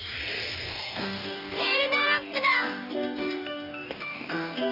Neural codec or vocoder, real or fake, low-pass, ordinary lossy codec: codec, 44.1 kHz, 2.6 kbps, DAC; fake; 5.4 kHz; none